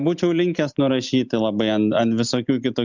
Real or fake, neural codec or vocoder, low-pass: real; none; 7.2 kHz